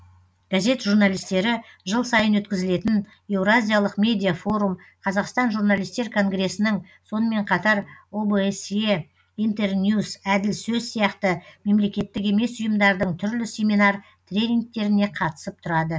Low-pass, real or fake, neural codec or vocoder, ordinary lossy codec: none; real; none; none